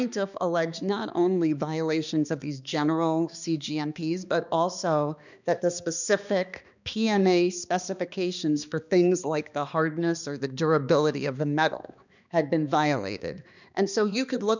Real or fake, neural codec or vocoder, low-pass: fake; codec, 16 kHz, 2 kbps, X-Codec, HuBERT features, trained on balanced general audio; 7.2 kHz